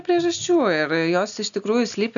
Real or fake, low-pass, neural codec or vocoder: real; 7.2 kHz; none